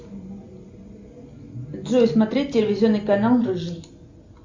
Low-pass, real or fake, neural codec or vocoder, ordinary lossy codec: 7.2 kHz; real; none; MP3, 64 kbps